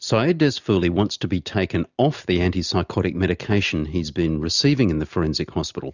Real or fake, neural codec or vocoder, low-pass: real; none; 7.2 kHz